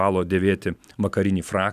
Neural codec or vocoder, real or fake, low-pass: none; real; 14.4 kHz